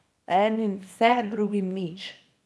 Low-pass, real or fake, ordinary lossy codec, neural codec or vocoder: none; fake; none; codec, 24 kHz, 0.9 kbps, WavTokenizer, small release